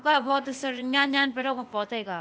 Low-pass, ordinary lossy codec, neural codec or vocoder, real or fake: none; none; codec, 16 kHz, 0.8 kbps, ZipCodec; fake